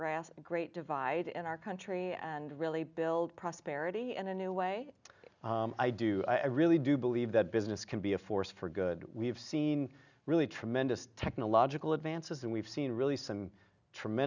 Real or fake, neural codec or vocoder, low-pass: real; none; 7.2 kHz